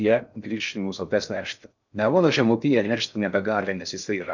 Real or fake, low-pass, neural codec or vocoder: fake; 7.2 kHz; codec, 16 kHz in and 24 kHz out, 0.6 kbps, FocalCodec, streaming, 4096 codes